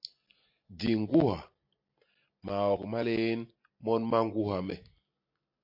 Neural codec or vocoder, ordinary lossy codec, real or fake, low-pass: none; MP3, 32 kbps; real; 5.4 kHz